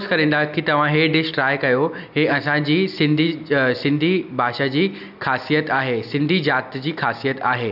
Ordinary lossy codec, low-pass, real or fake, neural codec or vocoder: none; 5.4 kHz; real; none